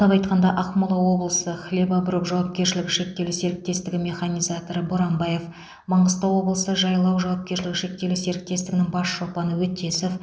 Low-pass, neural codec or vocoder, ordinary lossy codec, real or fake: none; none; none; real